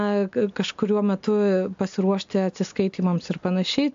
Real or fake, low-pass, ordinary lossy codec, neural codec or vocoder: real; 7.2 kHz; AAC, 64 kbps; none